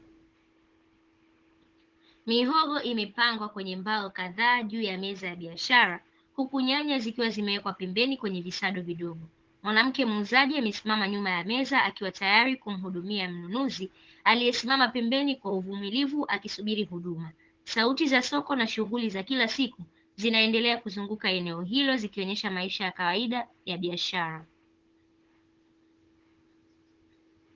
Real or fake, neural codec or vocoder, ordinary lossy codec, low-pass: fake; codec, 16 kHz, 16 kbps, FunCodec, trained on Chinese and English, 50 frames a second; Opus, 16 kbps; 7.2 kHz